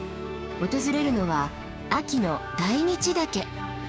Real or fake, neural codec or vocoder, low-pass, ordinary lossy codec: fake; codec, 16 kHz, 6 kbps, DAC; none; none